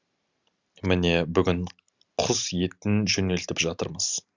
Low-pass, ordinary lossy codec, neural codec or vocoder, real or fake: 7.2 kHz; Opus, 64 kbps; none; real